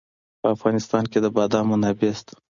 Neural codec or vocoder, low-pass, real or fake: none; 7.2 kHz; real